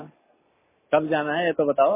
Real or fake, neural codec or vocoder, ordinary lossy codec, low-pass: real; none; MP3, 16 kbps; 3.6 kHz